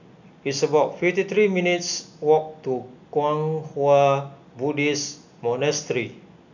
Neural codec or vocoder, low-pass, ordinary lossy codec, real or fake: none; 7.2 kHz; none; real